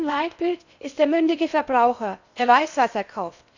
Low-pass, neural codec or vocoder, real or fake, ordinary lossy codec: 7.2 kHz; codec, 16 kHz in and 24 kHz out, 0.8 kbps, FocalCodec, streaming, 65536 codes; fake; none